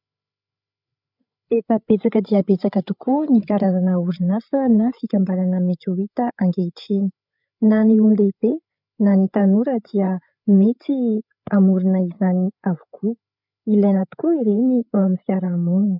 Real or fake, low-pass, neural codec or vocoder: fake; 5.4 kHz; codec, 16 kHz, 8 kbps, FreqCodec, larger model